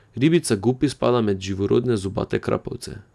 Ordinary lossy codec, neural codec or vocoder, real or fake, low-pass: none; none; real; none